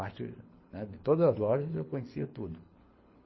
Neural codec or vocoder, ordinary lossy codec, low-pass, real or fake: codec, 24 kHz, 6 kbps, HILCodec; MP3, 24 kbps; 7.2 kHz; fake